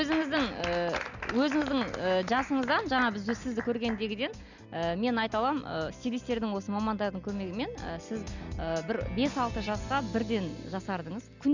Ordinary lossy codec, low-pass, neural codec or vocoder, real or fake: none; 7.2 kHz; none; real